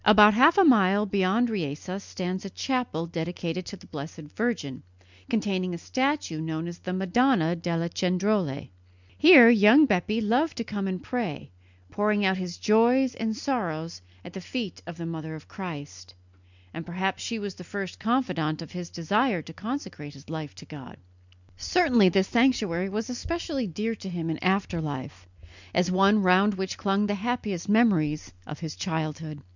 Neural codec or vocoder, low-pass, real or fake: none; 7.2 kHz; real